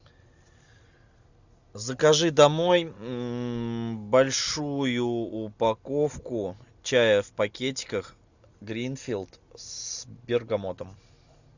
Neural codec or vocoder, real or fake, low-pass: none; real; 7.2 kHz